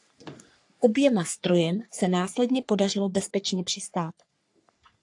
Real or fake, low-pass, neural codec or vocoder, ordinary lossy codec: fake; 10.8 kHz; codec, 44.1 kHz, 3.4 kbps, Pupu-Codec; AAC, 64 kbps